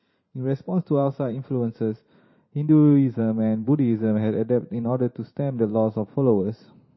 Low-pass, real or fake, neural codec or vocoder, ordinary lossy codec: 7.2 kHz; fake; vocoder, 44.1 kHz, 128 mel bands every 512 samples, BigVGAN v2; MP3, 24 kbps